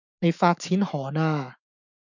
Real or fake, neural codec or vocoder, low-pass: fake; autoencoder, 48 kHz, 128 numbers a frame, DAC-VAE, trained on Japanese speech; 7.2 kHz